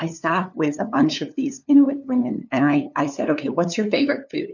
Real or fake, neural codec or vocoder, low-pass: fake; codec, 16 kHz, 2 kbps, FunCodec, trained on LibriTTS, 25 frames a second; 7.2 kHz